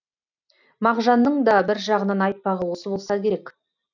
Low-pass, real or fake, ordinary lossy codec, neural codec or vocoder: 7.2 kHz; real; none; none